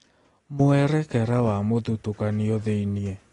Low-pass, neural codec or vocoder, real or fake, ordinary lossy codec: 10.8 kHz; none; real; AAC, 32 kbps